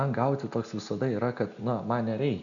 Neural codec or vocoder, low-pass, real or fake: none; 7.2 kHz; real